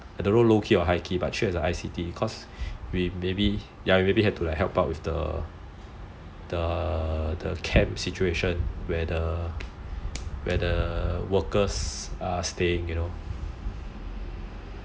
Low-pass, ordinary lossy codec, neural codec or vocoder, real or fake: none; none; none; real